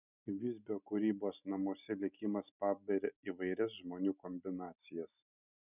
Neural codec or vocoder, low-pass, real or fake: none; 3.6 kHz; real